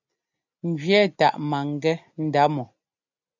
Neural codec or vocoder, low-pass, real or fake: none; 7.2 kHz; real